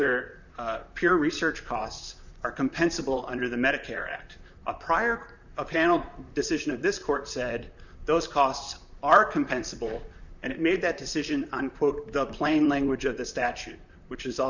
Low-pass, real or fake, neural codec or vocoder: 7.2 kHz; fake; vocoder, 44.1 kHz, 128 mel bands, Pupu-Vocoder